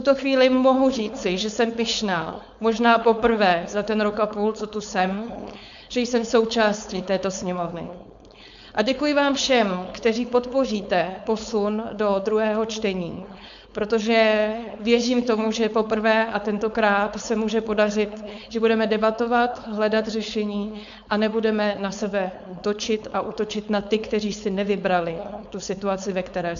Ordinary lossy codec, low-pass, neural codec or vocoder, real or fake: AAC, 96 kbps; 7.2 kHz; codec, 16 kHz, 4.8 kbps, FACodec; fake